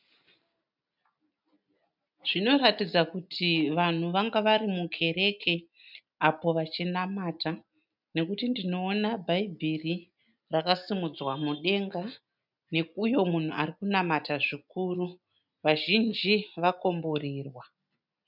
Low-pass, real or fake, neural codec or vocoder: 5.4 kHz; real; none